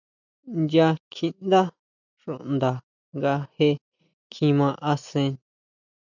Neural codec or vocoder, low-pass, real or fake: none; 7.2 kHz; real